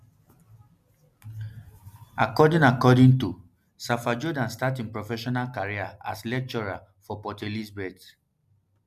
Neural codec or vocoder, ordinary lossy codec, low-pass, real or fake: none; none; 14.4 kHz; real